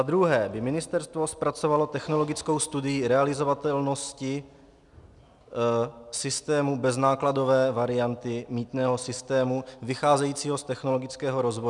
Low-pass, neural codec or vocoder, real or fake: 10.8 kHz; none; real